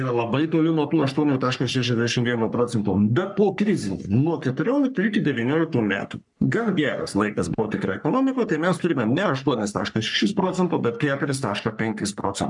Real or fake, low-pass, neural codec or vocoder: fake; 10.8 kHz; codec, 44.1 kHz, 3.4 kbps, Pupu-Codec